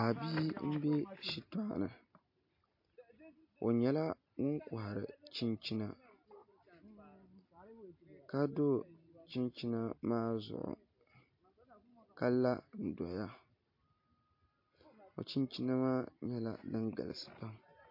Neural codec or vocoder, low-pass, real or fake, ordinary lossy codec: none; 5.4 kHz; real; MP3, 32 kbps